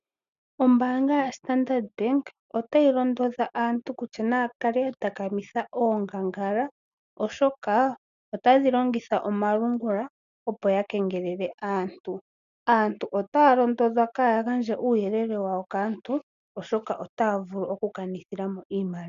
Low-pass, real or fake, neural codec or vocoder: 7.2 kHz; real; none